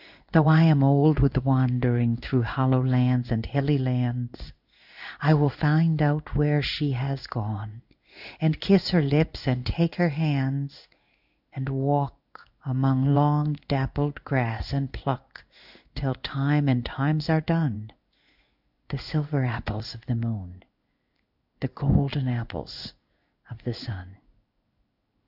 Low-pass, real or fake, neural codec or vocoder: 5.4 kHz; fake; codec, 16 kHz in and 24 kHz out, 1 kbps, XY-Tokenizer